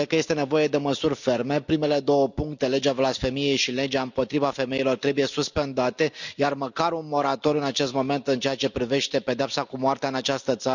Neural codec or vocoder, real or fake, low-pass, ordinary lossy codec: none; real; 7.2 kHz; none